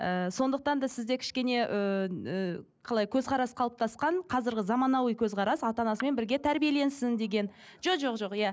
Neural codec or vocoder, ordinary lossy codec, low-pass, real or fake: none; none; none; real